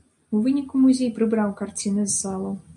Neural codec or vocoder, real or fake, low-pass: none; real; 10.8 kHz